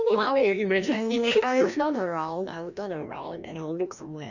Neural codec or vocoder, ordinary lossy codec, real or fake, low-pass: codec, 16 kHz, 1 kbps, FreqCodec, larger model; none; fake; 7.2 kHz